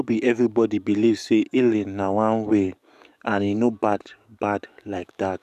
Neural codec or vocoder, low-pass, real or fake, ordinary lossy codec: codec, 44.1 kHz, 7.8 kbps, DAC; 14.4 kHz; fake; none